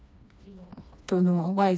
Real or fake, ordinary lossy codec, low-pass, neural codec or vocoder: fake; none; none; codec, 16 kHz, 2 kbps, FreqCodec, smaller model